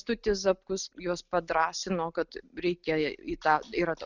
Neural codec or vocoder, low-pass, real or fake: none; 7.2 kHz; real